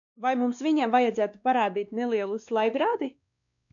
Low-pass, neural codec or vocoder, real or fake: 7.2 kHz; codec, 16 kHz, 2 kbps, X-Codec, WavLM features, trained on Multilingual LibriSpeech; fake